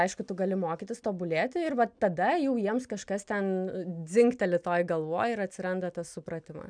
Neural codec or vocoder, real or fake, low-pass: none; real; 9.9 kHz